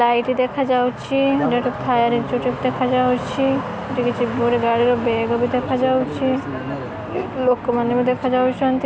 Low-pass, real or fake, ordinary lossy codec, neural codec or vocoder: none; real; none; none